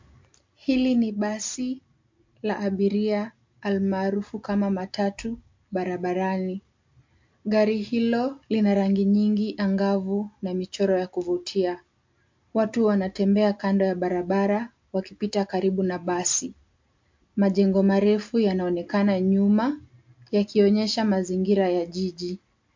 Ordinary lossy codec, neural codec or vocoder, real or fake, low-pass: MP3, 48 kbps; none; real; 7.2 kHz